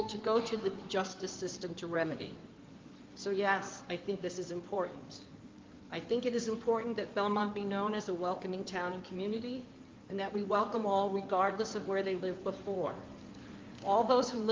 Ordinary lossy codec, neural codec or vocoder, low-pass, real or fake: Opus, 32 kbps; codec, 16 kHz in and 24 kHz out, 2.2 kbps, FireRedTTS-2 codec; 7.2 kHz; fake